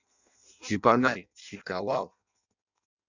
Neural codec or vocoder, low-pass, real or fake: codec, 16 kHz in and 24 kHz out, 0.6 kbps, FireRedTTS-2 codec; 7.2 kHz; fake